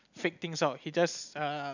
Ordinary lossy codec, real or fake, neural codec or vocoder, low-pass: none; real; none; 7.2 kHz